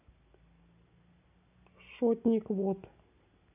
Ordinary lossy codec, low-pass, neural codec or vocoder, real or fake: AAC, 24 kbps; 3.6 kHz; none; real